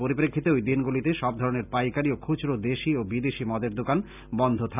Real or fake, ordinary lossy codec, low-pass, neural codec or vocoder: real; none; 3.6 kHz; none